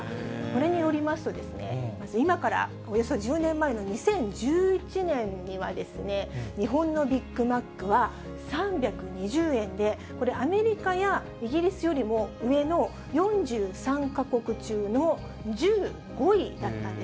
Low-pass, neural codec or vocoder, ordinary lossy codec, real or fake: none; none; none; real